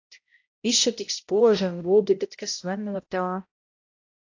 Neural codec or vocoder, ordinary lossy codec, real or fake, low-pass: codec, 16 kHz, 0.5 kbps, X-Codec, HuBERT features, trained on balanced general audio; AAC, 48 kbps; fake; 7.2 kHz